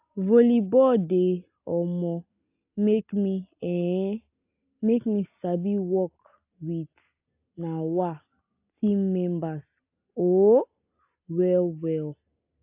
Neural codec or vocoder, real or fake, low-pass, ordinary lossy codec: none; real; 3.6 kHz; AAC, 32 kbps